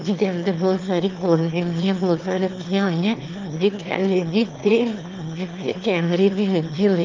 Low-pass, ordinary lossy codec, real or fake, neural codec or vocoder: 7.2 kHz; Opus, 24 kbps; fake; autoencoder, 22.05 kHz, a latent of 192 numbers a frame, VITS, trained on one speaker